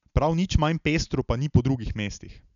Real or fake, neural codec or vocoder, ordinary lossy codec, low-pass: real; none; none; 7.2 kHz